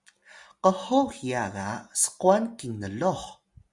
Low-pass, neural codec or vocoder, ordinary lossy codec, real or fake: 10.8 kHz; none; Opus, 64 kbps; real